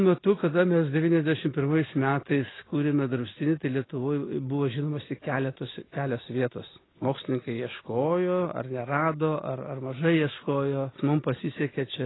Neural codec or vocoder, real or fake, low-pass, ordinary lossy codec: none; real; 7.2 kHz; AAC, 16 kbps